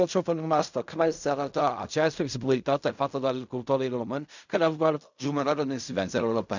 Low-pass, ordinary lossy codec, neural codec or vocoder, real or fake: 7.2 kHz; none; codec, 16 kHz in and 24 kHz out, 0.4 kbps, LongCat-Audio-Codec, fine tuned four codebook decoder; fake